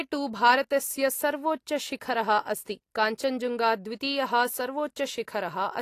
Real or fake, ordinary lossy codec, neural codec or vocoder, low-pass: real; AAC, 48 kbps; none; 14.4 kHz